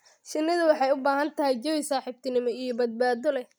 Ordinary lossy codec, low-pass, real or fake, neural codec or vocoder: none; none; real; none